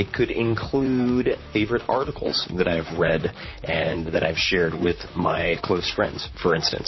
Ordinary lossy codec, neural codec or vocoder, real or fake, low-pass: MP3, 24 kbps; vocoder, 44.1 kHz, 128 mel bands, Pupu-Vocoder; fake; 7.2 kHz